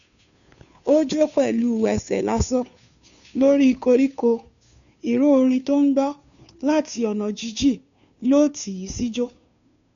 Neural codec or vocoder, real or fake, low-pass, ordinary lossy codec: codec, 16 kHz, 2 kbps, FunCodec, trained on Chinese and English, 25 frames a second; fake; 7.2 kHz; none